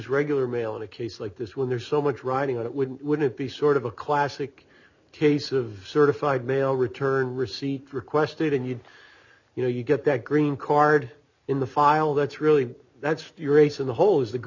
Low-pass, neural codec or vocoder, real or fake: 7.2 kHz; none; real